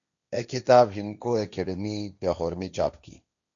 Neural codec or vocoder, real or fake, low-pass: codec, 16 kHz, 1.1 kbps, Voila-Tokenizer; fake; 7.2 kHz